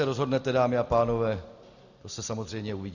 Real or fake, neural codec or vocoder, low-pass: real; none; 7.2 kHz